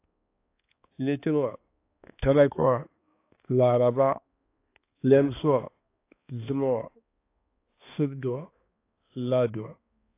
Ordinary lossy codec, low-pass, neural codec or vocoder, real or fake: AAC, 24 kbps; 3.6 kHz; codec, 16 kHz, 2 kbps, X-Codec, HuBERT features, trained on balanced general audio; fake